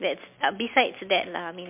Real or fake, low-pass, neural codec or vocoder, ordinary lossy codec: real; 3.6 kHz; none; MP3, 32 kbps